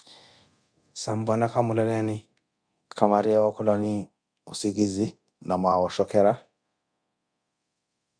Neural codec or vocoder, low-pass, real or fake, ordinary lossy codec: codec, 24 kHz, 0.9 kbps, DualCodec; 9.9 kHz; fake; Opus, 64 kbps